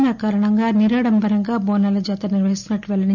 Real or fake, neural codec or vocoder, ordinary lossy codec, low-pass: real; none; none; 7.2 kHz